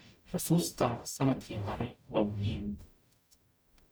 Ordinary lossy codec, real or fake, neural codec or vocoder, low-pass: none; fake; codec, 44.1 kHz, 0.9 kbps, DAC; none